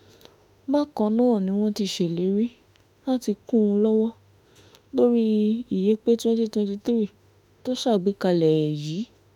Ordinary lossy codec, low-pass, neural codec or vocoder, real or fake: none; 19.8 kHz; autoencoder, 48 kHz, 32 numbers a frame, DAC-VAE, trained on Japanese speech; fake